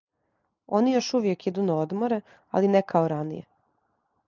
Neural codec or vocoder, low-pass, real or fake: none; 7.2 kHz; real